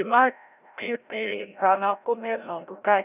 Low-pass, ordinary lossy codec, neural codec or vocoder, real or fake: 3.6 kHz; none; codec, 16 kHz, 0.5 kbps, FreqCodec, larger model; fake